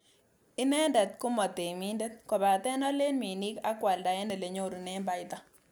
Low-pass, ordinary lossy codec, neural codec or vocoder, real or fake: none; none; none; real